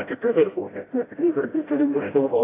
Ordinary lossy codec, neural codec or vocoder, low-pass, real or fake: AAC, 16 kbps; codec, 16 kHz, 0.5 kbps, FreqCodec, smaller model; 3.6 kHz; fake